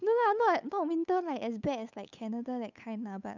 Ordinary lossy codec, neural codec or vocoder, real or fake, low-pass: none; codec, 16 kHz, 8 kbps, FunCodec, trained on Chinese and English, 25 frames a second; fake; 7.2 kHz